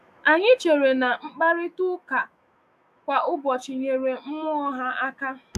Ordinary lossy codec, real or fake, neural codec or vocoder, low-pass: none; fake; autoencoder, 48 kHz, 128 numbers a frame, DAC-VAE, trained on Japanese speech; 14.4 kHz